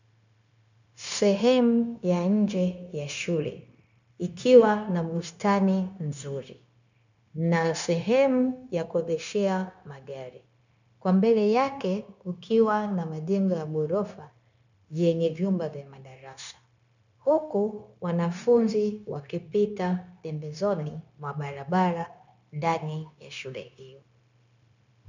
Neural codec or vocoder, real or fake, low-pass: codec, 16 kHz, 0.9 kbps, LongCat-Audio-Codec; fake; 7.2 kHz